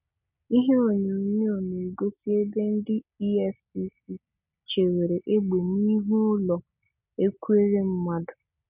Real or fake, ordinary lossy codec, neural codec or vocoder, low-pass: real; none; none; 3.6 kHz